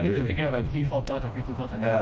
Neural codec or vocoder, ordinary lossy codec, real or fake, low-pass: codec, 16 kHz, 1 kbps, FreqCodec, smaller model; none; fake; none